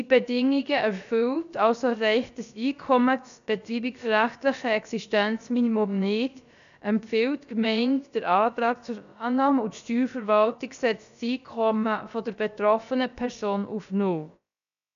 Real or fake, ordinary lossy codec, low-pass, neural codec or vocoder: fake; none; 7.2 kHz; codec, 16 kHz, about 1 kbps, DyCAST, with the encoder's durations